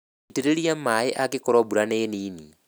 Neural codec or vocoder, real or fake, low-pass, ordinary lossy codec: none; real; none; none